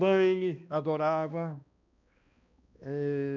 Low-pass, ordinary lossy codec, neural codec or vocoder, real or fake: 7.2 kHz; none; codec, 16 kHz, 1 kbps, X-Codec, HuBERT features, trained on balanced general audio; fake